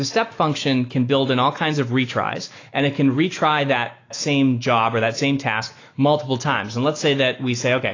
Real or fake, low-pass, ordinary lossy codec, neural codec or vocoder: fake; 7.2 kHz; AAC, 32 kbps; autoencoder, 48 kHz, 128 numbers a frame, DAC-VAE, trained on Japanese speech